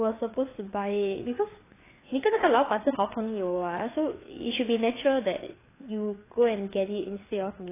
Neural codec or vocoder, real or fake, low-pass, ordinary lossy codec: codec, 16 kHz, 4 kbps, FreqCodec, larger model; fake; 3.6 kHz; AAC, 16 kbps